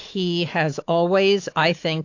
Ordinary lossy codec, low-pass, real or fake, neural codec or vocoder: AAC, 48 kbps; 7.2 kHz; fake; autoencoder, 48 kHz, 128 numbers a frame, DAC-VAE, trained on Japanese speech